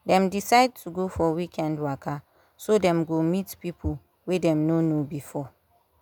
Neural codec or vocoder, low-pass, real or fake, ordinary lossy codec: none; none; real; none